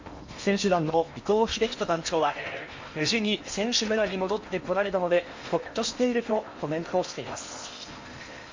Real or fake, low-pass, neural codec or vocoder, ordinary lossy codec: fake; 7.2 kHz; codec, 16 kHz in and 24 kHz out, 0.8 kbps, FocalCodec, streaming, 65536 codes; MP3, 48 kbps